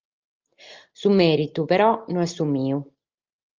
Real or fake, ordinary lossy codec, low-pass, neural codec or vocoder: real; Opus, 32 kbps; 7.2 kHz; none